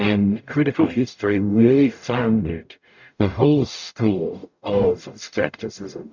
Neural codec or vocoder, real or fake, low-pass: codec, 44.1 kHz, 0.9 kbps, DAC; fake; 7.2 kHz